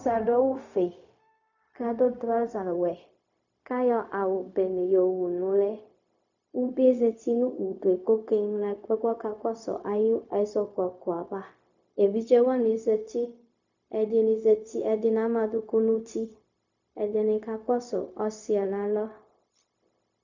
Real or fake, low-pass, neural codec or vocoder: fake; 7.2 kHz; codec, 16 kHz, 0.4 kbps, LongCat-Audio-Codec